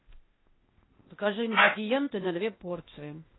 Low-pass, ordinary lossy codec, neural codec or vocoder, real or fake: 7.2 kHz; AAC, 16 kbps; codec, 16 kHz, 0.8 kbps, ZipCodec; fake